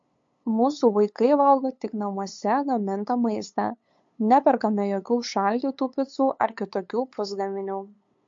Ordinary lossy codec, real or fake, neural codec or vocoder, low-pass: MP3, 48 kbps; fake; codec, 16 kHz, 8 kbps, FunCodec, trained on LibriTTS, 25 frames a second; 7.2 kHz